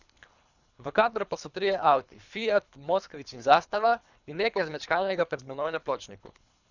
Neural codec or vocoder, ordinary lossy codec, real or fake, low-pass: codec, 24 kHz, 3 kbps, HILCodec; none; fake; 7.2 kHz